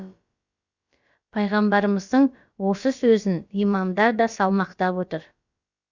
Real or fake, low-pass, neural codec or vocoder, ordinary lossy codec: fake; 7.2 kHz; codec, 16 kHz, about 1 kbps, DyCAST, with the encoder's durations; Opus, 64 kbps